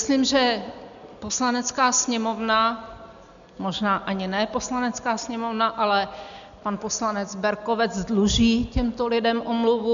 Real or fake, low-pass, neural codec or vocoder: real; 7.2 kHz; none